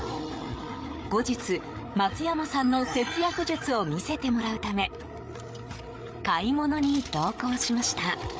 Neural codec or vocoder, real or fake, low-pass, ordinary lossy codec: codec, 16 kHz, 8 kbps, FreqCodec, larger model; fake; none; none